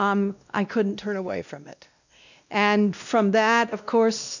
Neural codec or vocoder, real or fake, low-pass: codec, 16 kHz, 1 kbps, X-Codec, WavLM features, trained on Multilingual LibriSpeech; fake; 7.2 kHz